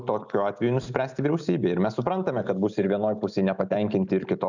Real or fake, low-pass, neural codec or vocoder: real; 7.2 kHz; none